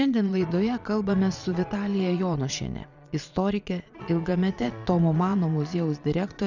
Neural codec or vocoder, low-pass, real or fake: vocoder, 22.05 kHz, 80 mel bands, Vocos; 7.2 kHz; fake